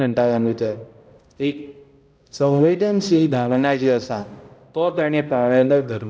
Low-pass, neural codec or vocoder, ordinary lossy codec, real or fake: none; codec, 16 kHz, 0.5 kbps, X-Codec, HuBERT features, trained on balanced general audio; none; fake